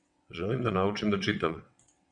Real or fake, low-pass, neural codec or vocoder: fake; 9.9 kHz; vocoder, 22.05 kHz, 80 mel bands, WaveNeXt